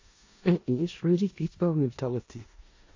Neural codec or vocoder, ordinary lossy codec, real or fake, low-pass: codec, 16 kHz in and 24 kHz out, 0.4 kbps, LongCat-Audio-Codec, four codebook decoder; AAC, 48 kbps; fake; 7.2 kHz